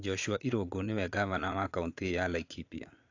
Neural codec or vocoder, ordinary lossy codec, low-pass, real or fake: vocoder, 22.05 kHz, 80 mel bands, Vocos; AAC, 48 kbps; 7.2 kHz; fake